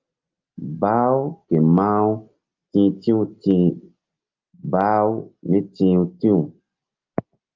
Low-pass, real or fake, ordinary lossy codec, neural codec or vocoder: 7.2 kHz; real; Opus, 24 kbps; none